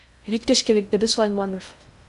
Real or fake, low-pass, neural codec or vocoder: fake; 10.8 kHz; codec, 16 kHz in and 24 kHz out, 0.6 kbps, FocalCodec, streaming, 2048 codes